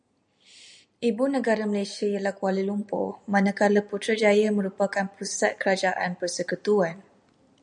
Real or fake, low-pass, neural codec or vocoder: real; 9.9 kHz; none